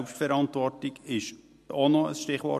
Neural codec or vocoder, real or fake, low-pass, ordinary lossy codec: none; real; 14.4 kHz; MP3, 64 kbps